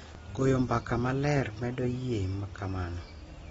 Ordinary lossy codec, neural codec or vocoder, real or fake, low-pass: AAC, 24 kbps; none; real; 19.8 kHz